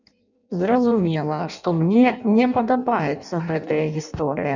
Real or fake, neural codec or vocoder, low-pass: fake; codec, 16 kHz in and 24 kHz out, 0.6 kbps, FireRedTTS-2 codec; 7.2 kHz